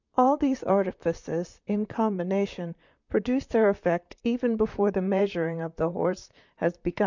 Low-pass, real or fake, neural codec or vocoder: 7.2 kHz; fake; vocoder, 44.1 kHz, 128 mel bands, Pupu-Vocoder